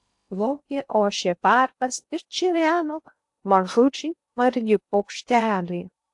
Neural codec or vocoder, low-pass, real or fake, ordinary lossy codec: codec, 16 kHz in and 24 kHz out, 0.6 kbps, FocalCodec, streaming, 2048 codes; 10.8 kHz; fake; AAC, 64 kbps